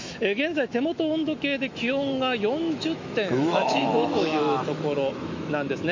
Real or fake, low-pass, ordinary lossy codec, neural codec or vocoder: fake; 7.2 kHz; MP3, 48 kbps; autoencoder, 48 kHz, 128 numbers a frame, DAC-VAE, trained on Japanese speech